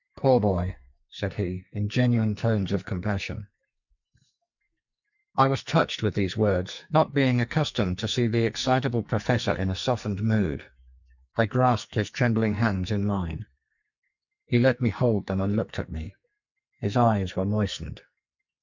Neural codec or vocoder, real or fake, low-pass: codec, 44.1 kHz, 2.6 kbps, SNAC; fake; 7.2 kHz